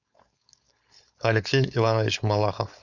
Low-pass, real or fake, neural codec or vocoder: 7.2 kHz; fake; codec, 16 kHz, 4.8 kbps, FACodec